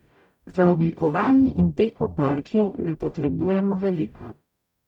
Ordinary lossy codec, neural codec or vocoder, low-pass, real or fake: none; codec, 44.1 kHz, 0.9 kbps, DAC; 19.8 kHz; fake